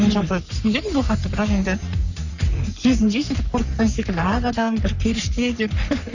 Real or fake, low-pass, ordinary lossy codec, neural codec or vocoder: fake; 7.2 kHz; none; codec, 44.1 kHz, 3.4 kbps, Pupu-Codec